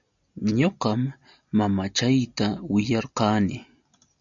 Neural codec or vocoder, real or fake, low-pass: none; real; 7.2 kHz